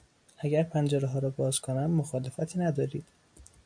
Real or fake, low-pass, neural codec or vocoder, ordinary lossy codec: real; 9.9 kHz; none; AAC, 64 kbps